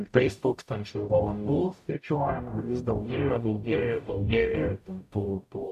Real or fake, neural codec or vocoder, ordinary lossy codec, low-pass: fake; codec, 44.1 kHz, 0.9 kbps, DAC; AAC, 96 kbps; 14.4 kHz